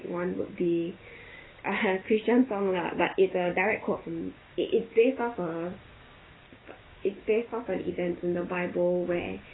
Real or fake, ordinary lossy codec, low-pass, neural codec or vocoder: fake; AAC, 16 kbps; 7.2 kHz; codec, 16 kHz, 6 kbps, DAC